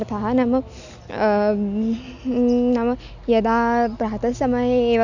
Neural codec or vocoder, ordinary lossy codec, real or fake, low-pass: none; none; real; 7.2 kHz